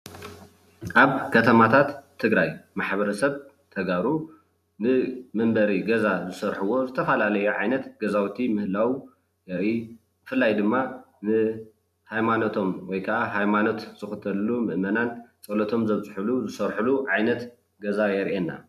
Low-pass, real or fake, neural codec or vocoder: 14.4 kHz; real; none